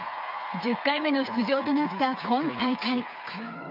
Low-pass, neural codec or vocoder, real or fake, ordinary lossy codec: 5.4 kHz; codec, 16 kHz, 8 kbps, FreqCodec, smaller model; fake; none